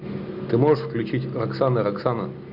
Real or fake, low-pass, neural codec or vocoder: real; 5.4 kHz; none